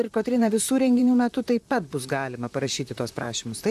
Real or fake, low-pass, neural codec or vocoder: fake; 14.4 kHz; vocoder, 44.1 kHz, 128 mel bands, Pupu-Vocoder